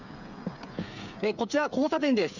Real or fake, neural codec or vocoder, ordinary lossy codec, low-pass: fake; codec, 16 kHz, 8 kbps, FreqCodec, smaller model; none; 7.2 kHz